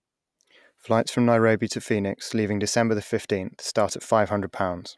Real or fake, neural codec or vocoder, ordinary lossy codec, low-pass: real; none; none; none